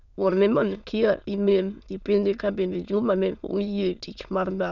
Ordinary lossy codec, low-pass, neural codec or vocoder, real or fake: none; 7.2 kHz; autoencoder, 22.05 kHz, a latent of 192 numbers a frame, VITS, trained on many speakers; fake